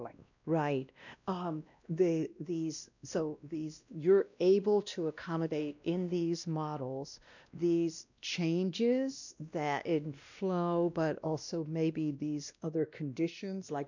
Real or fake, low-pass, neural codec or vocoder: fake; 7.2 kHz; codec, 16 kHz, 1 kbps, X-Codec, WavLM features, trained on Multilingual LibriSpeech